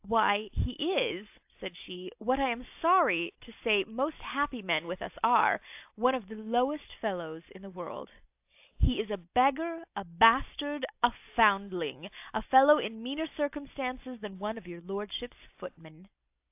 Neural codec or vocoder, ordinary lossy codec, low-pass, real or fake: none; AAC, 32 kbps; 3.6 kHz; real